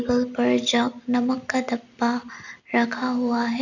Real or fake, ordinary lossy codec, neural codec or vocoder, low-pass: real; none; none; 7.2 kHz